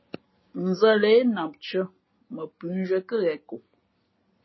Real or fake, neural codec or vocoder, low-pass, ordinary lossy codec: real; none; 7.2 kHz; MP3, 24 kbps